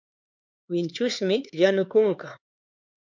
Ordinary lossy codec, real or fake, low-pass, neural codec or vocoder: MP3, 64 kbps; fake; 7.2 kHz; codec, 16 kHz, 4 kbps, X-Codec, HuBERT features, trained on LibriSpeech